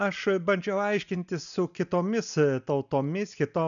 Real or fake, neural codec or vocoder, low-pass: real; none; 7.2 kHz